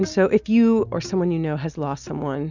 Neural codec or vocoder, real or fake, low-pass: none; real; 7.2 kHz